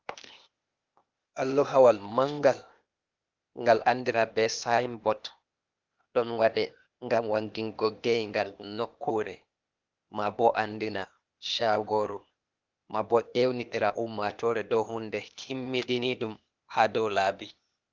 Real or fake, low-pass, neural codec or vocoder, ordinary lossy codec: fake; 7.2 kHz; codec, 16 kHz, 0.8 kbps, ZipCodec; Opus, 24 kbps